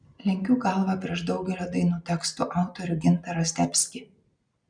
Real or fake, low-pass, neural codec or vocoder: real; 9.9 kHz; none